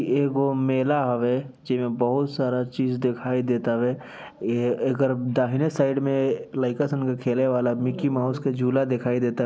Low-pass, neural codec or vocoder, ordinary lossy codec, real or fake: none; none; none; real